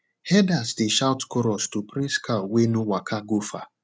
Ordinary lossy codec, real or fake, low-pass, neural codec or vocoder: none; real; none; none